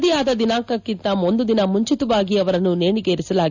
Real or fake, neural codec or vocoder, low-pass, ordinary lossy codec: real; none; 7.2 kHz; none